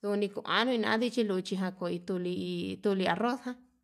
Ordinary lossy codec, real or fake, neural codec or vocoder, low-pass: none; real; none; 14.4 kHz